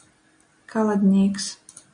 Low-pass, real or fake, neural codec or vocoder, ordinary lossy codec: 9.9 kHz; real; none; AAC, 64 kbps